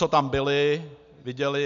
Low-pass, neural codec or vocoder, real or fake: 7.2 kHz; none; real